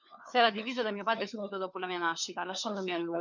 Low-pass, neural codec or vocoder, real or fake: 7.2 kHz; codec, 16 kHz, 4 kbps, FunCodec, trained on LibriTTS, 50 frames a second; fake